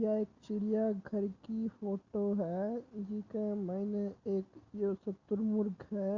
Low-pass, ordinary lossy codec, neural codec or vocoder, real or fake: 7.2 kHz; Opus, 64 kbps; none; real